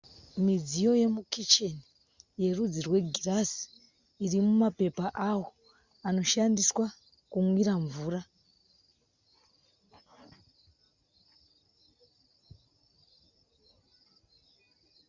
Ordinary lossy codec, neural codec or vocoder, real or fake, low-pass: Opus, 64 kbps; none; real; 7.2 kHz